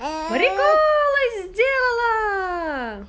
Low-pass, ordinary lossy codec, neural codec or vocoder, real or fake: none; none; none; real